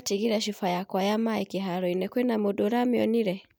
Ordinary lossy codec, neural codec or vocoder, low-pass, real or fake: none; none; none; real